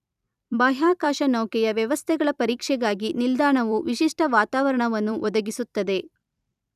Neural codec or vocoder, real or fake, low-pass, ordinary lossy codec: vocoder, 44.1 kHz, 128 mel bands every 256 samples, BigVGAN v2; fake; 14.4 kHz; none